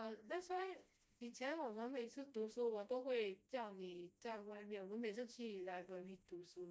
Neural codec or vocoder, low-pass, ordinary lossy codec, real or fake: codec, 16 kHz, 1 kbps, FreqCodec, smaller model; none; none; fake